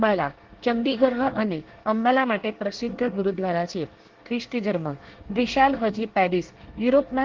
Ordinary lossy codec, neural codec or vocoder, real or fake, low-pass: Opus, 16 kbps; codec, 24 kHz, 1 kbps, SNAC; fake; 7.2 kHz